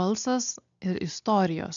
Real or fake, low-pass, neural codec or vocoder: real; 7.2 kHz; none